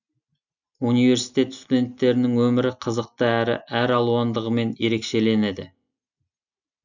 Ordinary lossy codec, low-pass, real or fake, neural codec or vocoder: none; 7.2 kHz; real; none